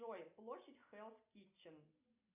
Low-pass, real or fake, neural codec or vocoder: 3.6 kHz; real; none